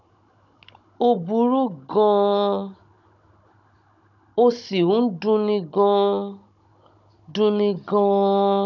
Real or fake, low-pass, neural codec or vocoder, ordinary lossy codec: fake; 7.2 kHz; codec, 16 kHz, 16 kbps, FunCodec, trained on Chinese and English, 50 frames a second; none